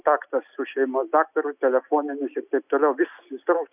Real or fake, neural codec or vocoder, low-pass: real; none; 3.6 kHz